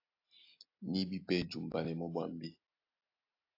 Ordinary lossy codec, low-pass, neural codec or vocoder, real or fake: AAC, 32 kbps; 5.4 kHz; none; real